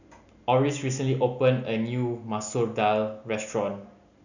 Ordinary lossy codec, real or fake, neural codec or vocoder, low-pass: none; real; none; 7.2 kHz